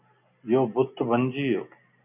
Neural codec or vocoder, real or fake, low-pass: none; real; 3.6 kHz